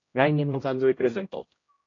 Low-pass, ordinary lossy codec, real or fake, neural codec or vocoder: 7.2 kHz; AAC, 32 kbps; fake; codec, 16 kHz, 0.5 kbps, X-Codec, HuBERT features, trained on general audio